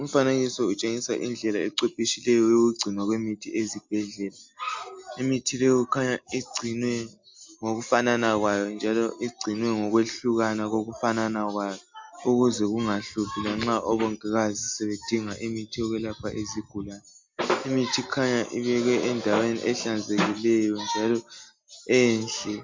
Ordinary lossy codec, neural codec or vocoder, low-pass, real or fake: MP3, 64 kbps; none; 7.2 kHz; real